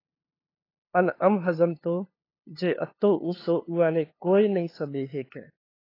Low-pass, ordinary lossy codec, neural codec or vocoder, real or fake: 5.4 kHz; AAC, 24 kbps; codec, 16 kHz, 2 kbps, FunCodec, trained on LibriTTS, 25 frames a second; fake